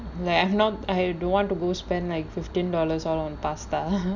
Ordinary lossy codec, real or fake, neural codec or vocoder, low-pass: none; real; none; 7.2 kHz